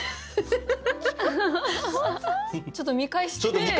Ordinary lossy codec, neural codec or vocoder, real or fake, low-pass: none; none; real; none